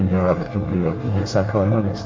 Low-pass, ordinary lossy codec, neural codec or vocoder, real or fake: 7.2 kHz; Opus, 32 kbps; codec, 24 kHz, 1 kbps, SNAC; fake